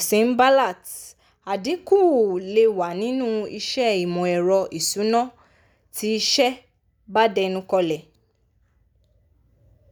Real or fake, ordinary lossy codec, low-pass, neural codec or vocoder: real; none; none; none